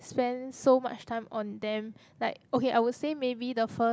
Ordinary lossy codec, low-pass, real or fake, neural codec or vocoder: none; none; real; none